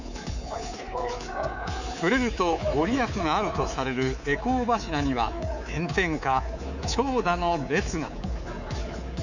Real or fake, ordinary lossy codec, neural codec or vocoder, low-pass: fake; none; codec, 24 kHz, 3.1 kbps, DualCodec; 7.2 kHz